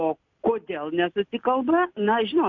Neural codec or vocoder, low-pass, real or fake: none; 7.2 kHz; real